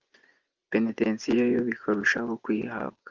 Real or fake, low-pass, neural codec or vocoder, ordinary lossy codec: fake; 7.2 kHz; vocoder, 24 kHz, 100 mel bands, Vocos; Opus, 32 kbps